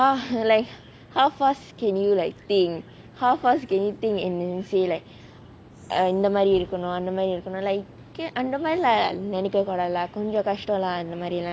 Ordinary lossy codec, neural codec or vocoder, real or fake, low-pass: none; none; real; none